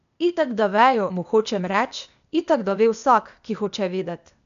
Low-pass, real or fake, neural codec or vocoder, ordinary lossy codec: 7.2 kHz; fake; codec, 16 kHz, 0.8 kbps, ZipCodec; none